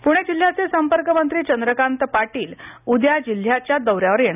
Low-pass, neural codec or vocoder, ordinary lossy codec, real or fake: 3.6 kHz; none; none; real